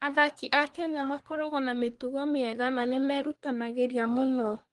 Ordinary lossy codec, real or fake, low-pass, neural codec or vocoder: Opus, 24 kbps; fake; 10.8 kHz; codec, 24 kHz, 1 kbps, SNAC